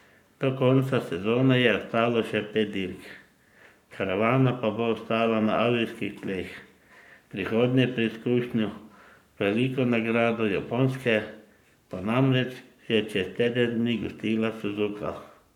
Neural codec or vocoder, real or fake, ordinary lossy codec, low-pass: codec, 44.1 kHz, 7.8 kbps, Pupu-Codec; fake; none; 19.8 kHz